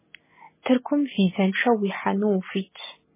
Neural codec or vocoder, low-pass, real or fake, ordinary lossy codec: none; 3.6 kHz; real; MP3, 16 kbps